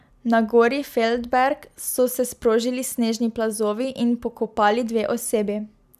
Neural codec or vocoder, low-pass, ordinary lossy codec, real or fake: none; 14.4 kHz; none; real